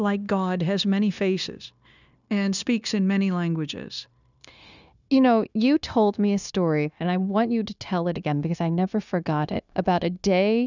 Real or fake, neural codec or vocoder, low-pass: fake; codec, 16 kHz, 0.9 kbps, LongCat-Audio-Codec; 7.2 kHz